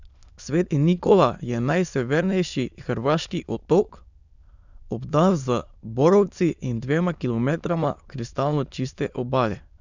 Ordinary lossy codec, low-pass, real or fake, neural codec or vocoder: none; 7.2 kHz; fake; autoencoder, 22.05 kHz, a latent of 192 numbers a frame, VITS, trained on many speakers